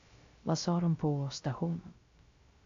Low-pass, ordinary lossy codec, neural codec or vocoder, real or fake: 7.2 kHz; AAC, 48 kbps; codec, 16 kHz, 0.3 kbps, FocalCodec; fake